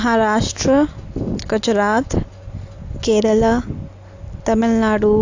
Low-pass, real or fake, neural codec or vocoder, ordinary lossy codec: 7.2 kHz; real; none; AAC, 48 kbps